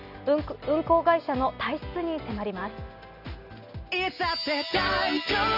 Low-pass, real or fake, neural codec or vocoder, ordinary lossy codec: 5.4 kHz; real; none; none